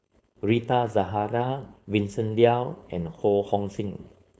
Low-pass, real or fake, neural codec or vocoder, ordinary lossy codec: none; fake; codec, 16 kHz, 4.8 kbps, FACodec; none